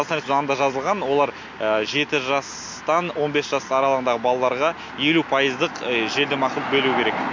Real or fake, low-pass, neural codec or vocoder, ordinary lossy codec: real; 7.2 kHz; none; MP3, 48 kbps